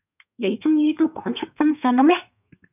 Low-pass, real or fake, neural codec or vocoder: 3.6 kHz; fake; codec, 32 kHz, 1.9 kbps, SNAC